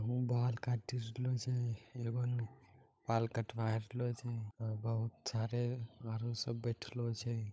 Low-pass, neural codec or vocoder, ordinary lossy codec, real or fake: none; codec, 16 kHz, 16 kbps, FunCodec, trained on LibriTTS, 50 frames a second; none; fake